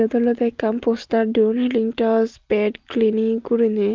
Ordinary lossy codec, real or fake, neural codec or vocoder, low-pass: Opus, 32 kbps; real; none; 7.2 kHz